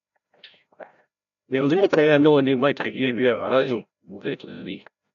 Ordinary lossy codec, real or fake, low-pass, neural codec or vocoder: none; fake; 7.2 kHz; codec, 16 kHz, 0.5 kbps, FreqCodec, larger model